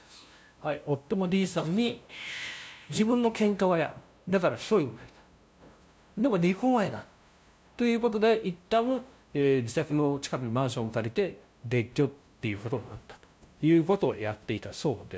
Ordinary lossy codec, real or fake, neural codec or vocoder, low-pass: none; fake; codec, 16 kHz, 0.5 kbps, FunCodec, trained on LibriTTS, 25 frames a second; none